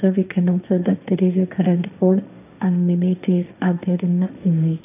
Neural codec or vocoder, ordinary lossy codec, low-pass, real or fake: codec, 16 kHz, 1.1 kbps, Voila-Tokenizer; none; 3.6 kHz; fake